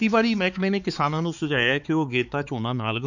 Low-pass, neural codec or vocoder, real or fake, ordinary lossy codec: 7.2 kHz; codec, 16 kHz, 4 kbps, X-Codec, HuBERT features, trained on balanced general audio; fake; none